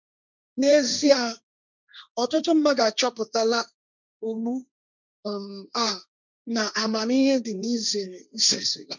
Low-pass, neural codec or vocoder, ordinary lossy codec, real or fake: 7.2 kHz; codec, 16 kHz, 1.1 kbps, Voila-Tokenizer; none; fake